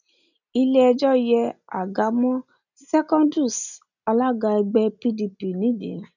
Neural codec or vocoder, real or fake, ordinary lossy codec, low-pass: none; real; none; 7.2 kHz